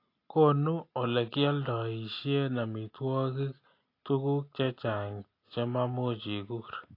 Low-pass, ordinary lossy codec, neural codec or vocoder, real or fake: 5.4 kHz; AAC, 32 kbps; none; real